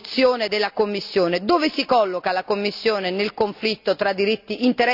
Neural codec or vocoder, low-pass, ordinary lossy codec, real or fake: none; 5.4 kHz; none; real